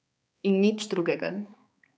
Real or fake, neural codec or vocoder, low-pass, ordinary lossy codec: fake; codec, 16 kHz, 4 kbps, X-Codec, HuBERT features, trained on balanced general audio; none; none